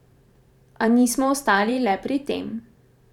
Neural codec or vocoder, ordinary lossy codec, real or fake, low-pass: vocoder, 44.1 kHz, 128 mel bands every 512 samples, BigVGAN v2; none; fake; 19.8 kHz